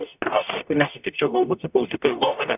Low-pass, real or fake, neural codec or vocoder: 3.6 kHz; fake; codec, 44.1 kHz, 0.9 kbps, DAC